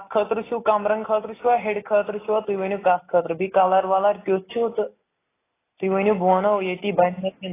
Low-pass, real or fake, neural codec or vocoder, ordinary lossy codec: 3.6 kHz; real; none; AAC, 16 kbps